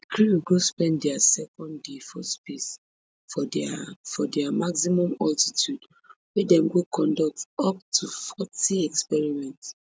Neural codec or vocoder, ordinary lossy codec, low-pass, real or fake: none; none; none; real